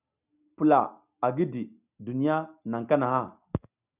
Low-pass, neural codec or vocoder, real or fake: 3.6 kHz; none; real